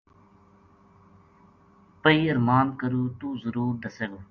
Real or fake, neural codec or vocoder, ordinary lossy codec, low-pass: real; none; Opus, 64 kbps; 7.2 kHz